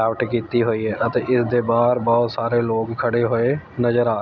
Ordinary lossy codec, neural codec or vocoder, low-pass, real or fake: none; none; 7.2 kHz; real